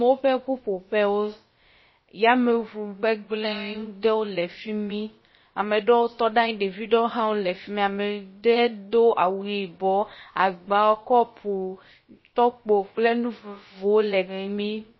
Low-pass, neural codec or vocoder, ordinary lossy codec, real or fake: 7.2 kHz; codec, 16 kHz, about 1 kbps, DyCAST, with the encoder's durations; MP3, 24 kbps; fake